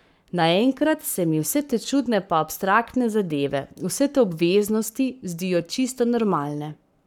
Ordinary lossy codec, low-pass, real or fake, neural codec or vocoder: none; 19.8 kHz; fake; codec, 44.1 kHz, 7.8 kbps, Pupu-Codec